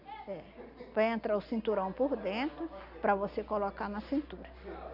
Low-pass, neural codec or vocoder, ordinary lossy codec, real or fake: 5.4 kHz; none; none; real